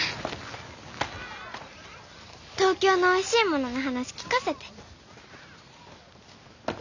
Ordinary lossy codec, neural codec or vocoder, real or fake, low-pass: none; none; real; 7.2 kHz